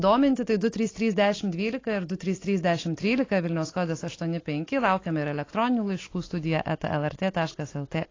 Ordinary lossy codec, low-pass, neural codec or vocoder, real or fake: AAC, 32 kbps; 7.2 kHz; none; real